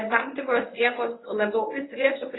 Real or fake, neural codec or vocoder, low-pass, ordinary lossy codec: fake; codec, 24 kHz, 0.9 kbps, WavTokenizer, medium speech release version 1; 7.2 kHz; AAC, 16 kbps